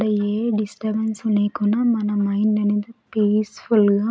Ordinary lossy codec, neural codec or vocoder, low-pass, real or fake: none; none; none; real